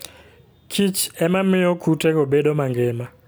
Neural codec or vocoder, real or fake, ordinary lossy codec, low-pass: none; real; none; none